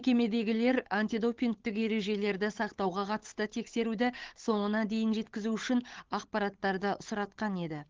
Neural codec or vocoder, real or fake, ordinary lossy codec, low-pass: codec, 16 kHz, 8 kbps, FunCodec, trained on Chinese and English, 25 frames a second; fake; Opus, 16 kbps; 7.2 kHz